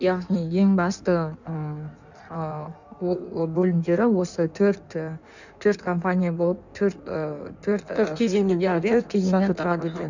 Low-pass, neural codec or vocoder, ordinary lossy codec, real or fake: 7.2 kHz; codec, 16 kHz in and 24 kHz out, 1.1 kbps, FireRedTTS-2 codec; none; fake